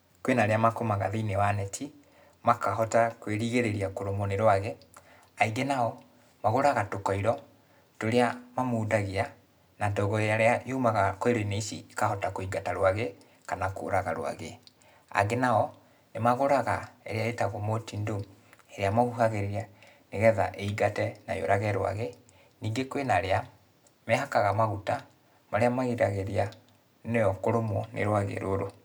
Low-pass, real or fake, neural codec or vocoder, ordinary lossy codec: none; real; none; none